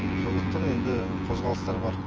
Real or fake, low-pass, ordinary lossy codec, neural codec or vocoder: fake; 7.2 kHz; Opus, 24 kbps; vocoder, 24 kHz, 100 mel bands, Vocos